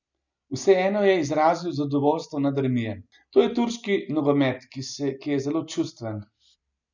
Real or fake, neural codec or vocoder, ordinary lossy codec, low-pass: real; none; none; 7.2 kHz